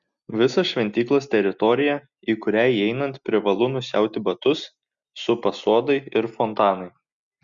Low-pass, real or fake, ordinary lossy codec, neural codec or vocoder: 7.2 kHz; real; Opus, 64 kbps; none